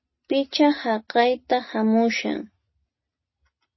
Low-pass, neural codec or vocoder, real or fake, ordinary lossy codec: 7.2 kHz; none; real; MP3, 24 kbps